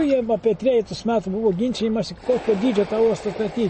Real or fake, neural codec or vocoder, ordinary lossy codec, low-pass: real; none; MP3, 32 kbps; 10.8 kHz